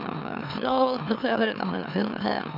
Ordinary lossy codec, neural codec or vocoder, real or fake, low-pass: none; autoencoder, 44.1 kHz, a latent of 192 numbers a frame, MeloTTS; fake; 5.4 kHz